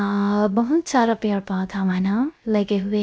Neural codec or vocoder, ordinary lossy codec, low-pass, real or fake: codec, 16 kHz, 0.3 kbps, FocalCodec; none; none; fake